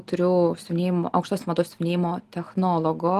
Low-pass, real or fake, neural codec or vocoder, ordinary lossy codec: 14.4 kHz; real; none; Opus, 24 kbps